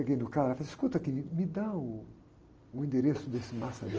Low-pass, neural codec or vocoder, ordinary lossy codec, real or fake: 7.2 kHz; none; Opus, 24 kbps; real